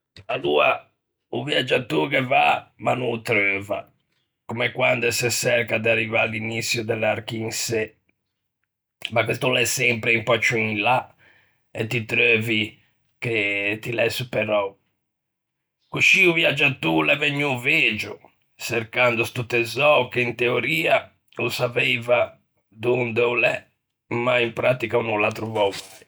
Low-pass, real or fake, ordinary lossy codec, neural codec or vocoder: none; real; none; none